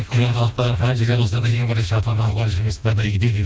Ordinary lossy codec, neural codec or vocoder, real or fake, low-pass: none; codec, 16 kHz, 1 kbps, FreqCodec, smaller model; fake; none